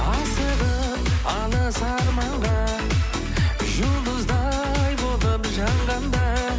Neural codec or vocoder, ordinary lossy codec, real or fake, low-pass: none; none; real; none